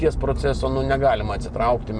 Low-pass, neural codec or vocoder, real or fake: 9.9 kHz; none; real